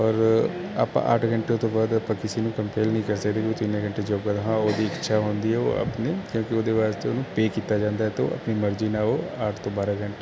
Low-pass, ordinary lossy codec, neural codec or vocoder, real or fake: none; none; none; real